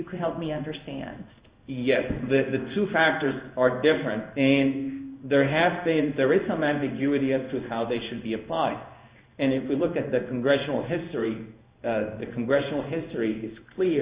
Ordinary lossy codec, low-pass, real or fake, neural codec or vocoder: Opus, 64 kbps; 3.6 kHz; fake; codec, 16 kHz in and 24 kHz out, 1 kbps, XY-Tokenizer